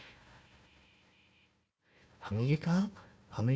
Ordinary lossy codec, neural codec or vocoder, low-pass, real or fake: none; codec, 16 kHz, 1 kbps, FunCodec, trained on Chinese and English, 50 frames a second; none; fake